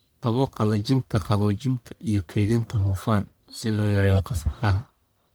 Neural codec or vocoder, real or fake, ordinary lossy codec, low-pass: codec, 44.1 kHz, 1.7 kbps, Pupu-Codec; fake; none; none